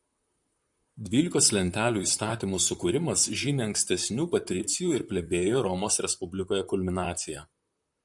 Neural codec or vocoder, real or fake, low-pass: vocoder, 44.1 kHz, 128 mel bands, Pupu-Vocoder; fake; 10.8 kHz